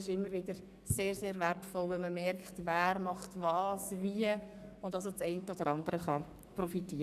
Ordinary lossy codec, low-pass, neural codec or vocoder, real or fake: none; 14.4 kHz; codec, 44.1 kHz, 2.6 kbps, SNAC; fake